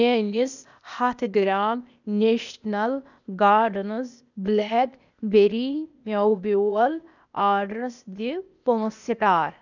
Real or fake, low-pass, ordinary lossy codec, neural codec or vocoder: fake; 7.2 kHz; none; codec, 16 kHz, 0.8 kbps, ZipCodec